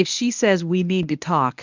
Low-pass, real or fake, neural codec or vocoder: 7.2 kHz; fake; codec, 24 kHz, 0.9 kbps, WavTokenizer, medium speech release version 1